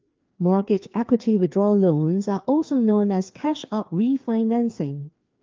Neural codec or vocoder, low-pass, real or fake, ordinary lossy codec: codec, 16 kHz, 2 kbps, FreqCodec, larger model; 7.2 kHz; fake; Opus, 24 kbps